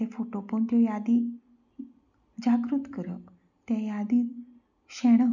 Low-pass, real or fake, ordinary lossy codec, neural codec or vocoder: 7.2 kHz; real; none; none